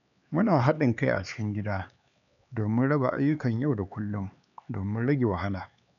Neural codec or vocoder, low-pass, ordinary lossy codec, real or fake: codec, 16 kHz, 4 kbps, X-Codec, HuBERT features, trained on LibriSpeech; 7.2 kHz; none; fake